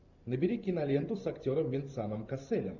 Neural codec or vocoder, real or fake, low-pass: vocoder, 44.1 kHz, 128 mel bands every 512 samples, BigVGAN v2; fake; 7.2 kHz